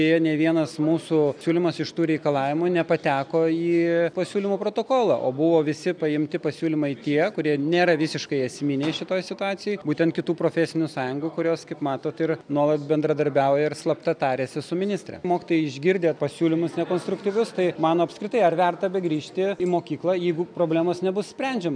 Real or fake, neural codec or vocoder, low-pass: real; none; 9.9 kHz